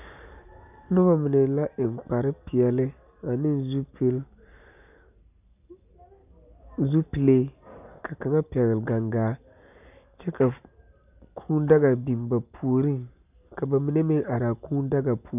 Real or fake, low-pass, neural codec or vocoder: real; 3.6 kHz; none